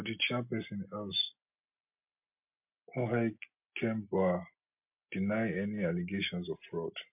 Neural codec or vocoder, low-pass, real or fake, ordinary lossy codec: none; 3.6 kHz; real; MP3, 32 kbps